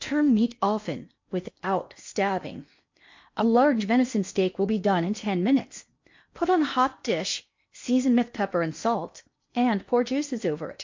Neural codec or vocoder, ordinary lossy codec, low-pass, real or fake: codec, 16 kHz in and 24 kHz out, 0.8 kbps, FocalCodec, streaming, 65536 codes; MP3, 48 kbps; 7.2 kHz; fake